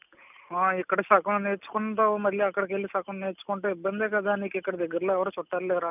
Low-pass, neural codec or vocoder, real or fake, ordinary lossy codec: 3.6 kHz; none; real; none